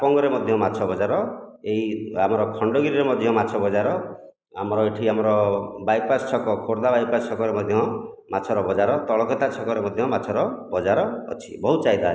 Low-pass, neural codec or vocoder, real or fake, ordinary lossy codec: none; none; real; none